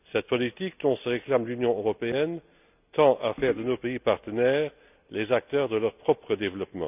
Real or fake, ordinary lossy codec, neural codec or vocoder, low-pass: real; none; none; 3.6 kHz